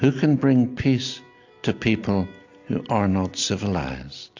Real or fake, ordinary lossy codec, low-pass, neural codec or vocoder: real; AAC, 48 kbps; 7.2 kHz; none